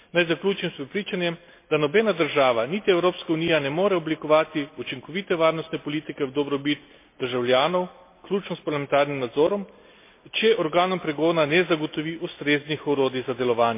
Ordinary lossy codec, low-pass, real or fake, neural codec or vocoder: MP3, 24 kbps; 3.6 kHz; real; none